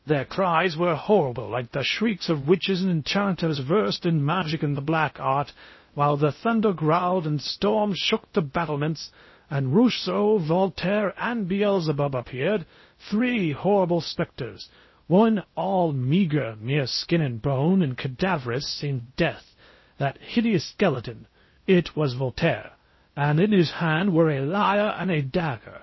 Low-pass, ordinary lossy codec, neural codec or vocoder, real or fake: 7.2 kHz; MP3, 24 kbps; codec, 16 kHz in and 24 kHz out, 0.6 kbps, FocalCodec, streaming, 2048 codes; fake